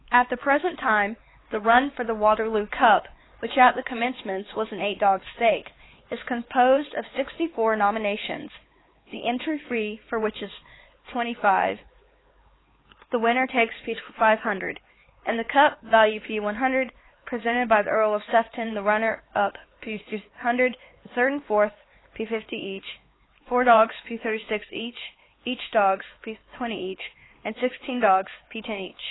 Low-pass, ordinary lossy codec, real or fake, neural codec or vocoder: 7.2 kHz; AAC, 16 kbps; fake; codec, 16 kHz, 4 kbps, X-Codec, HuBERT features, trained on LibriSpeech